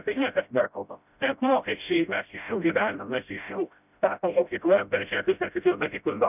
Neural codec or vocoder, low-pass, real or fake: codec, 16 kHz, 0.5 kbps, FreqCodec, smaller model; 3.6 kHz; fake